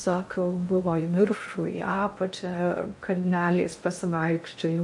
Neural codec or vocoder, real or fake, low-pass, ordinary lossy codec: codec, 16 kHz in and 24 kHz out, 0.6 kbps, FocalCodec, streaming, 2048 codes; fake; 10.8 kHz; MP3, 48 kbps